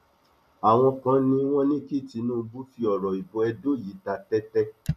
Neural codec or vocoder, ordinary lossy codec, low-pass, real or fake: none; none; 14.4 kHz; real